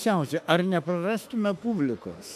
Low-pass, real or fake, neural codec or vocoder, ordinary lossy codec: 14.4 kHz; fake; autoencoder, 48 kHz, 32 numbers a frame, DAC-VAE, trained on Japanese speech; AAC, 96 kbps